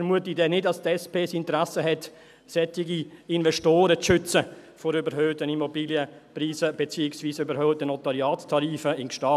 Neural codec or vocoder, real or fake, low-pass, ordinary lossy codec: none; real; 14.4 kHz; none